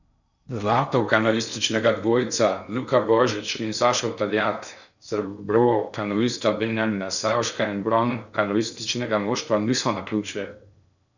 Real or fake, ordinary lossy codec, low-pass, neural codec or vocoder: fake; none; 7.2 kHz; codec, 16 kHz in and 24 kHz out, 0.6 kbps, FocalCodec, streaming, 4096 codes